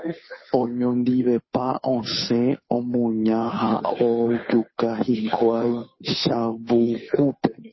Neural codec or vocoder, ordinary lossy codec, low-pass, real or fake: codec, 16 kHz, 2 kbps, FunCodec, trained on Chinese and English, 25 frames a second; MP3, 24 kbps; 7.2 kHz; fake